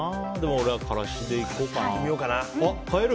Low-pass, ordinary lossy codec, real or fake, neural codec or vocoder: none; none; real; none